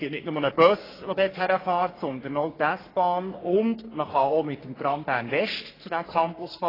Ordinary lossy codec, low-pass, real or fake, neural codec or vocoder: AAC, 24 kbps; 5.4 kHz; fake; codec, 44.1 kHz, 3.4 kbps, Pupu-Codec